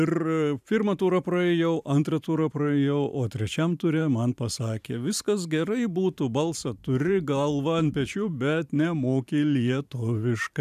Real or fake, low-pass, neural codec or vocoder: fake; 14.4 kHz; vocoder, 44.1 kHz, 128 mel bands every 512 samples, BigVGAN v2